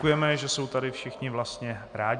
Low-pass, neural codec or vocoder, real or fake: 9.9 kHz; none; real